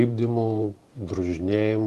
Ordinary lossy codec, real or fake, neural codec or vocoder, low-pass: Opus, 24 kbps; fake; vocoder, 44.1 kHz, 128 mel bands every 512 samples, BigVGAN v2; 14.4 kHz